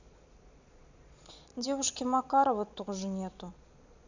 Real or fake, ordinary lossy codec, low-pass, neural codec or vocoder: fake; none; 7.2 kHz; vocoder, 22.05 kHz, 80 mel bands, Vocos